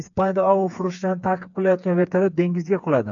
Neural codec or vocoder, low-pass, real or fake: codec, 16 kHz, 4 kbps, FreqCodec, smaller model; 7.2 kHz; fake